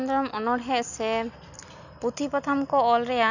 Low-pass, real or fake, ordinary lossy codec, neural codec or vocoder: 7.2 kHz; real; none; none